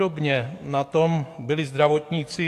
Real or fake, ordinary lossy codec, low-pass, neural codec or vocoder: fake; AAC, 64 kbps; 14.4 kHz; codec, 44.1 kHz, 7.8 kbps, DAC